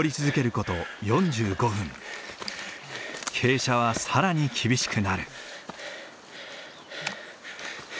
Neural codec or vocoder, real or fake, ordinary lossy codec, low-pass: none; real; none; none